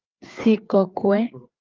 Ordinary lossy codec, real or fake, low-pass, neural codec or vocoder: Opus, 24 kbps; fake; 7.2 kHz; codec, 16 kHz, 4 kbps, FreqCodec, larger model